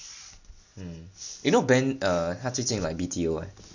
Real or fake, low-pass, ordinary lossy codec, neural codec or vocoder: real; 7.2 kHz; none; none